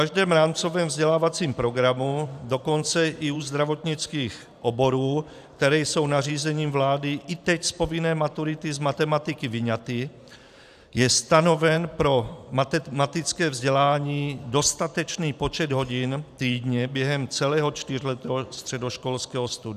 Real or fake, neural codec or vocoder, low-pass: fake; vocoder, 44.1 kHz, 128 mel bands every 512 samples, BigVGAN v2; 14.4 kHz